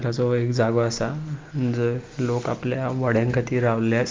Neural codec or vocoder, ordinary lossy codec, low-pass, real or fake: none; Opus, 24 kbps; 7.2 kHz; real